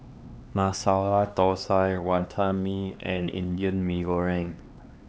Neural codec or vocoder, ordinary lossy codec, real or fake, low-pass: codec, 16 kHz, 2 kbps, X-Codec, HuBERT features, trained on LibriSpeech; none; fake; none